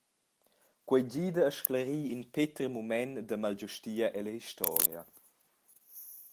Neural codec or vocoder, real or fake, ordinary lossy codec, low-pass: none; real; Opus, 24 kbps; 14.4 kHz